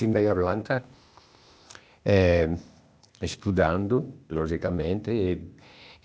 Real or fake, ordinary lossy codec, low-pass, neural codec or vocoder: fake; none; none; codec, 16 kHz, 0.8 kbps, ZipCodec